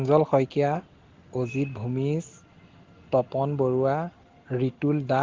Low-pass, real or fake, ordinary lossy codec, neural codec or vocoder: 7.2 kHz; real; Opus, 16 kbps; none